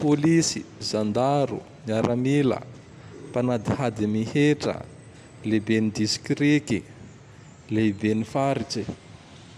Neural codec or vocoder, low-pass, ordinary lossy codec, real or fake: none; 14.4 kHz; none; real